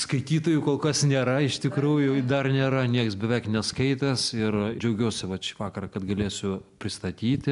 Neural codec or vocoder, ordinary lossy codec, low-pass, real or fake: none; AAC, 96 kbps; 10.8 kHz; real